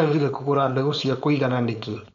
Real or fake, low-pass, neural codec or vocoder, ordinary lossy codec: fake; 7.2 kHz; codec, 16 kHz, 4.8 kbps, FACodec; none